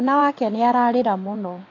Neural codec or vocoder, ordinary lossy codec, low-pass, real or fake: vocoder, 22.05 kHz, 80 mel bands, WaveNeXt; AAC, 32 kbps; 7.2 kHz; fake